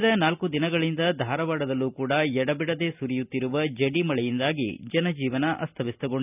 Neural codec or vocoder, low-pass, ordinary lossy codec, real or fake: none; 3.6 kHz; none; real